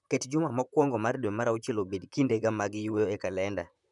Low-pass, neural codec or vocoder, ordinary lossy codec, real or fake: 10.8 kHz; vocoder, 44.1 kHz, 128 mel bands, Pupu-Vocoder; none; fake